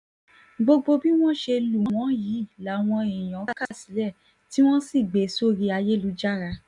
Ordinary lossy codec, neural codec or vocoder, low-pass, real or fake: none; none; 10.8 kHz; real